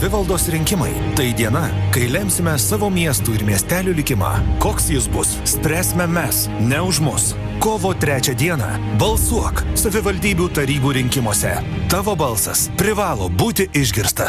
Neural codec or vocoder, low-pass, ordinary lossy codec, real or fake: none; 14.4 kHz; Opus, 64 kbps; real